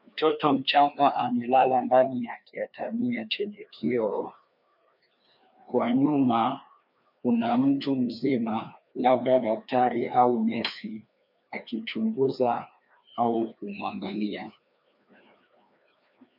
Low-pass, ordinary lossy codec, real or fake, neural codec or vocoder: 5.4 kHz; MP3, 48 kbps; fake; codec, 16 kHz, 2 kbps, FreqCodec, larger model